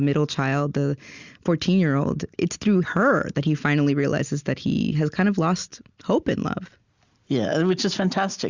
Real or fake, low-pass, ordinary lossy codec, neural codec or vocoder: real; 7.2 kHz; Opus, 64 kbps; none